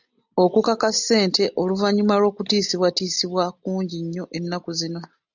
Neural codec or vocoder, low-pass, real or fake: none; 7.2 kHz; real